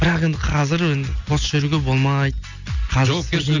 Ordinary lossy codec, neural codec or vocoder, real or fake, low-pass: none; none; real; 7.2 kHz